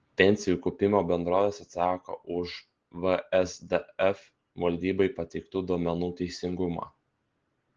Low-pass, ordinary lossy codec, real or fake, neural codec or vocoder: 7.2 kHz; Opus, 16 kbps; real; none